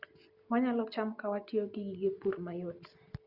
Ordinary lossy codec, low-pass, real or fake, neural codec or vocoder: Opus, 64 kbps; 5.4 kHz; real; none